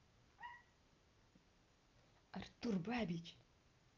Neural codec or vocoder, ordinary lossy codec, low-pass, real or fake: none; Opus, 24 kbps; 7.2 kHz; real